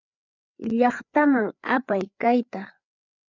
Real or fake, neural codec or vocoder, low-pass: fake; codec, 16 kHz, 4 kbps, FreqCodec, larger model; 7.2 kHz